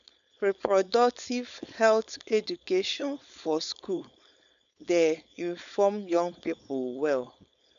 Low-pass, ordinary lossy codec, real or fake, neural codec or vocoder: 7.2 kHz; none; fake; codec, 16 kHz, 4.8 kbps, FACodec